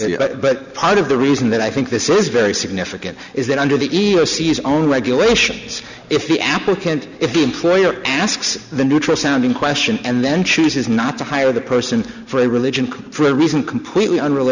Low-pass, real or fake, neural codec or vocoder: 7.2 kHz; real; none